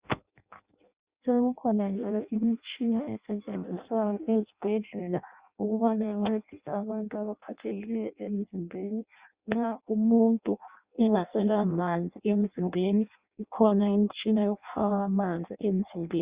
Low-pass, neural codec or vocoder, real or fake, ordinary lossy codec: 3.6 kHz; codec, 16 kHz in and 24 kHz out, 0.6 kbps, FireRedTTS-2 codec; fake; Opus, 64 kbps